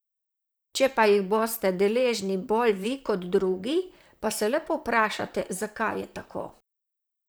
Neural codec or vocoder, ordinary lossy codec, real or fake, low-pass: vocoder, 44.1 kHz, 128 mel bands, Pupu-Vocoder; none; fake; none